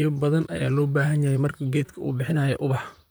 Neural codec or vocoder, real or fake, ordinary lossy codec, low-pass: vocoder, 44.1 kHz, 128 mel bands, Pupu-Vocoder; fake; none; none